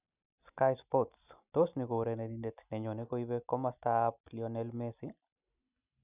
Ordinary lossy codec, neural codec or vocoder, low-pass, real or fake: none; none; 3.6 kHz; real